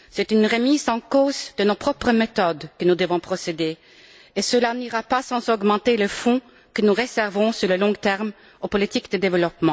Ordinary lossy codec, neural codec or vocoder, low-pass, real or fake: none; none; none; real